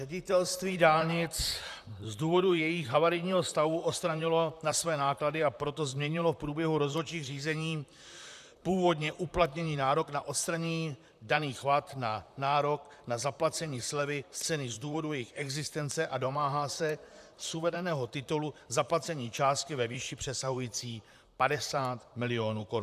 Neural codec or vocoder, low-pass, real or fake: vocoder, 44.1 kHz, 128 mel bands, Pupu-Vocoder; 14.4 kHz; fake